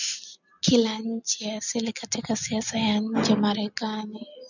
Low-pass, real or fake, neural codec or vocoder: 7.2 kHz; real; none